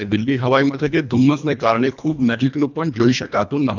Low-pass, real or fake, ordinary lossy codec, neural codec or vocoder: 7.2 kHz; fake; none; codec, 24 kHz, 1.5 kbps, HILCodec